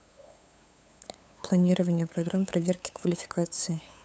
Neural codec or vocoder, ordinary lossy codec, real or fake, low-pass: codec, 16 kHz, 8 kbps, FunCodec, trained on LibriTTS, 25 frames a second; none; fake; none